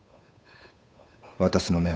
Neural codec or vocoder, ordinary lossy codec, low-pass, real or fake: codec, 16 kHz, 8 kbps, FunCodec, trained on Chinese and English, 25 frames a second; none; none; fake